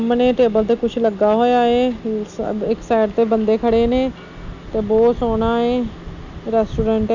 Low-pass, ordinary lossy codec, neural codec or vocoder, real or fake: 7.2 kHz; none; none; real